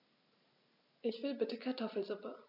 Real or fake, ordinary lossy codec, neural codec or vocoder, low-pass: real; none; none; 5.4 kHz